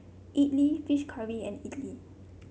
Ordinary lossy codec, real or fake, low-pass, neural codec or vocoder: none; real; none; none